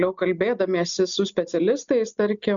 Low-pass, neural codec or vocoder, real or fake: 7.2 kHz; none; real